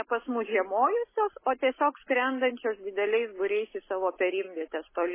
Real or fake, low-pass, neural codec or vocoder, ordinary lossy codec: real; 3.6 kHz; none; MP3, 16 kbps